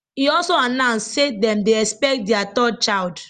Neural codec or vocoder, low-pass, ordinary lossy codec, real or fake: none; 14.4 kHz; Opus, 32 kbps; real